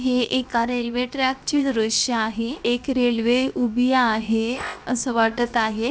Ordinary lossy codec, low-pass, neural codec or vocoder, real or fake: none; none; codec, 16 kHz, 0.7 kbps, FocalCodec; fake